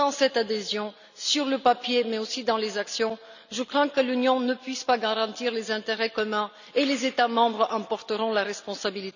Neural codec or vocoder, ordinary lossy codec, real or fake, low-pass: none; none; real; 7.2 kHz